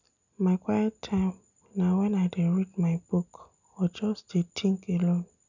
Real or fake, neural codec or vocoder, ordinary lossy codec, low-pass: real; none; none; 7.2 kHz